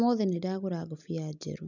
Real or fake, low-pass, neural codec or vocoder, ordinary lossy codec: real; 7.2 kHz; none; none